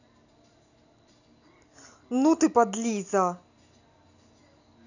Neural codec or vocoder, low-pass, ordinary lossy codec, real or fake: none; 7.2 kHz; none; real